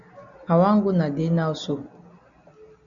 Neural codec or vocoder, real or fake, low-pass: none; real; 7.2 kHz